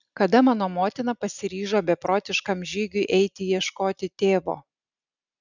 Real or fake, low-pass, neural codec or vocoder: real; 7.2 kHz; none